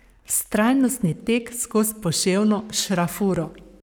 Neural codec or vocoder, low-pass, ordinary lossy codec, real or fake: codec, 44.1 kHz, 7.8 kbps, Pupu-Codec; none; none; fake